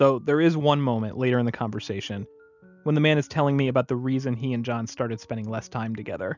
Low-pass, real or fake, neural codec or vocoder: 7.2 kHz; real; none